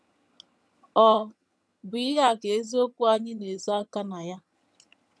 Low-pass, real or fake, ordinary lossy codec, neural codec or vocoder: none; fake; none; vocoder, 22.05 kHz, 80 mel bands, HiFi-GAN